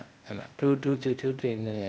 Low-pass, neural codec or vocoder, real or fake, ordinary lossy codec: none; codec, 16 kHz, 0.8 kbps, ZipCodec; fake; none